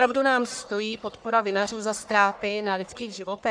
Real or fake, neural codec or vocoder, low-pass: fake; codec, 44.1 kHz, 1.7 kbps, Pupu-Codec; 9.9 kHz